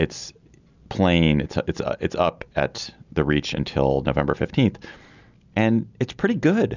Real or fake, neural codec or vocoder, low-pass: real; none; 7.2 kHz